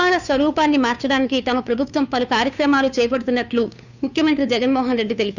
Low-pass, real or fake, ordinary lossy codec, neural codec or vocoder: 7.2 kHz; fake; none; codec, 16 kHz, 2 kbps, FunCodec, trained on Chinese and English, 25 frames a second